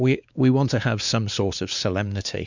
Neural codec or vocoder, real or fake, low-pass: codec, 16 kHz, 2 kbps, X-Codec, WavLM features, trained on Multilingual LibriSpeech; fake; 7.2 kHz